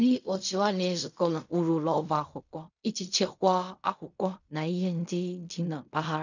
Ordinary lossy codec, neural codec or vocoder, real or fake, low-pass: none; codec, 16 kHz in and 24 kHz out, 0.4 kbps, LongCat-Audio-Codec, fine tuned four codebook decoder; fake; 7.2 kHz